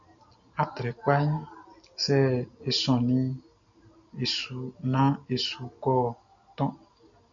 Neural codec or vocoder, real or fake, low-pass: none; real; 7.2 kHz